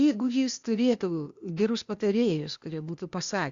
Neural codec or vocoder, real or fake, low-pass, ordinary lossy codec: codec, 16 kHz, 0.8 kbps, ZipCodec; fake; 7.2 kHz; Opus, 64 kbps